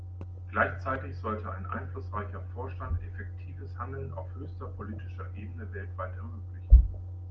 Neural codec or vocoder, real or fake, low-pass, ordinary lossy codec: none; real; 7.2 kHz; Opus, 24 kbps